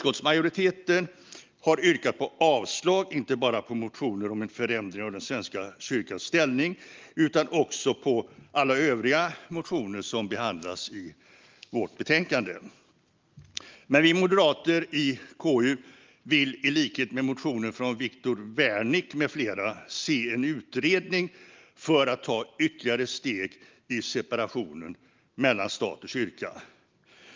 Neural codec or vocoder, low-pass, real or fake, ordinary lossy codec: none; 7.2 kHz; real; Opus, 24 kbps